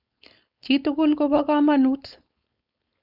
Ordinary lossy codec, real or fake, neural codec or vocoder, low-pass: none; fake; codec, 16 kHz, 4.8 kbps, FACodec; 5.4 kHz